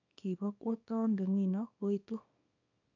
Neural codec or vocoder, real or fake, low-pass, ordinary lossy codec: codec, 24 kHz, 0.9 kbps, WavTokenizer, small release; fake; 7.2 kHz; none